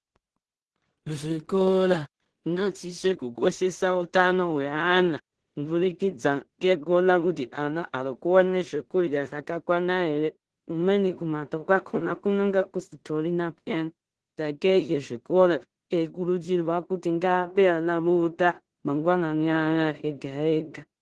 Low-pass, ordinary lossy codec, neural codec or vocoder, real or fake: 10.8 kHz; Opus, 16 kbps; codec, 16 kHz in and 24 kHz out, 0.4 kbps, LongCat-Audio-Codec, two codebook decoder; fake